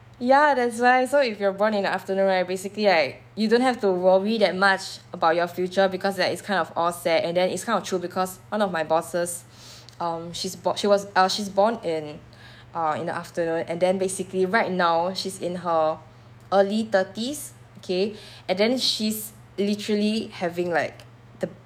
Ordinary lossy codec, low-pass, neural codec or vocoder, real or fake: none; 19.8 kHz; autoencoder, 48 kHz, 128 numbers a frame, DAC-VAE, trained on Japanese speech; fake